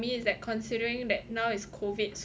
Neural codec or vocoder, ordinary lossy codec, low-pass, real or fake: none; none; none; real